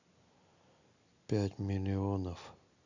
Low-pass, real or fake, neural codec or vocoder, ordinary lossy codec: 7.2 kHz; real; none; none